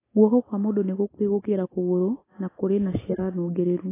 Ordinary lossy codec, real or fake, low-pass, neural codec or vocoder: AAC, 16 kbps; real; 3.6 kHz; none